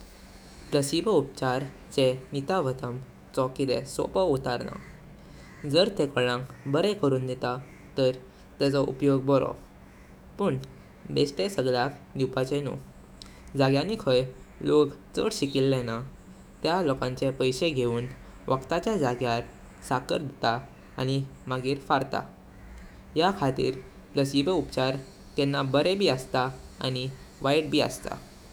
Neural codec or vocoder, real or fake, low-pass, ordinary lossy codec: autoencoder, 48 kHz, 128 numbers a frame, DAC-VAE, trained on Japanese speech; fake; none; none